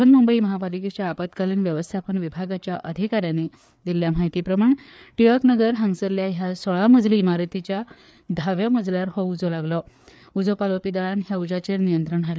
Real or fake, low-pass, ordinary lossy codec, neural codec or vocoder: fake; none; none; codec, 16 kHz, 4 kbps, FreqCodec, larger model